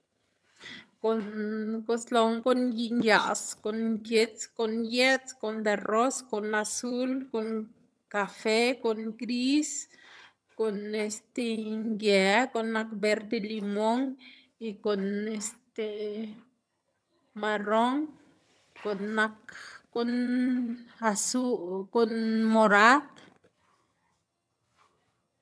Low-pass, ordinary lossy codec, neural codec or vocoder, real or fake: none; none; vocoder, 22.05 kHz, 80 mel bands, HiFi-GAN; fake